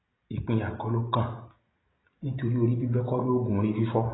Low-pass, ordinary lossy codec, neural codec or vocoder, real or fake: 7.2 kHz; AAC, 16 kbps; none; real